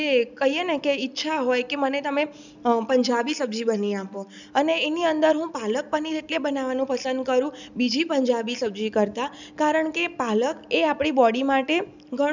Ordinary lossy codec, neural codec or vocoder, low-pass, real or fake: none; none; 7.2 kHz; real